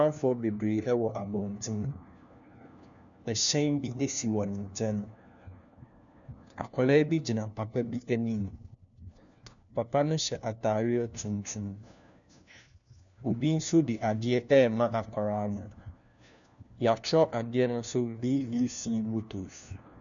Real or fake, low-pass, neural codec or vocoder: fake; 7.2 kHz; codec, 16 kHz, 1 kbps, FunCodec, trained on LibriTTS, 50 frames a second